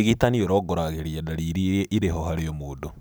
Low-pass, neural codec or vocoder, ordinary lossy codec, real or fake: none; none; none; real